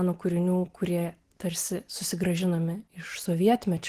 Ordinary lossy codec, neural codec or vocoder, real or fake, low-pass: Opus, 16 kbps; none; real; 14.4 kHz